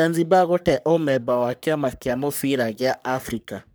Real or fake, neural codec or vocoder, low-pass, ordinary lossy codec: fake; codec, 44.1 kHz, 3.4 kbps, Pupu-Codec; none; none